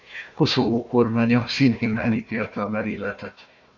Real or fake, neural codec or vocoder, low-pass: fake; codec, 16 kHz, 1 kbps, FunCodec, trained on Chinese and English, 50 frames a second; 7.2 kHz